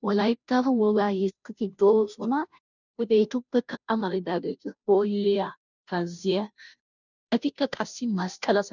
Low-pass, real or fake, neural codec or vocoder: 7.2 kHz; fake; codec, 16 kHz, 0.5 kbps, FunCodec, trained on Chinese and English, 25 frames a second